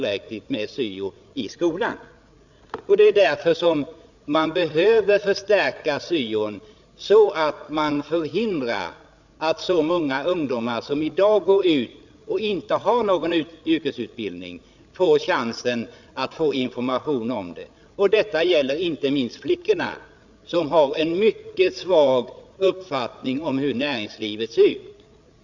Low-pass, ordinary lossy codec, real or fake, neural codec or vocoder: 7.2 kHz; none; fake; codec, 16 kHz, 8 kbps, FreqCodec, larger model